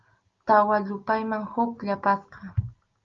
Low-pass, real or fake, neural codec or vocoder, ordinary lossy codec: 7.2 kHz; real; none; Opus, 32 kbps